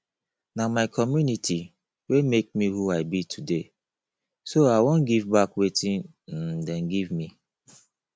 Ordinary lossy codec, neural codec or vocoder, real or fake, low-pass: none; none; real; none